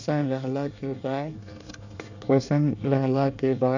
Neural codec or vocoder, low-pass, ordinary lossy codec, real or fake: codec, 24 kHz, 1 kbps, SNAC; 7.2 kHz; none; fake